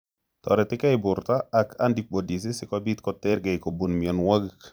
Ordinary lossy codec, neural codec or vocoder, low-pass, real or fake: none; vocoder, 44.1 kHz, 128 mel bands every 256 samples, BigVGAN v2; none; fake